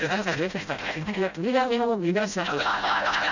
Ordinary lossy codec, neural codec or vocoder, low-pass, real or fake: none; codec, 16 kHz, 0.5 kbps, FreqCodec, smaller model; 7.2 kHz; fake